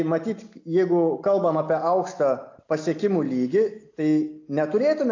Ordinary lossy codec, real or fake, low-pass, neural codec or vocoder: AAC, 48 kbps; real; 7.2 kHz; none